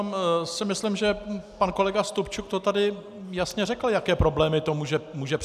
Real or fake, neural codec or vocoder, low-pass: real; none; 14.4 kHz